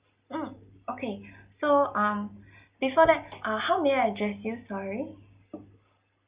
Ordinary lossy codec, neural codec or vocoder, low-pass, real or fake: Opus, 64 kbps; none; 3.6 kHz; real